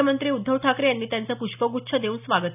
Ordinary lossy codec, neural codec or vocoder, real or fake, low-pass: none; none; real; 3.6 kHz